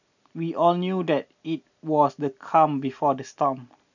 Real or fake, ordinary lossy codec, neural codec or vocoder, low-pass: real; none; none; 7.2 kHz